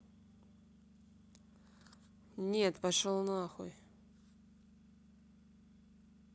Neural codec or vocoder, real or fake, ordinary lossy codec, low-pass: none; real; none; none